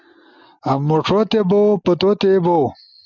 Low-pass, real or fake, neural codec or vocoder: 7.2 kHz; real; none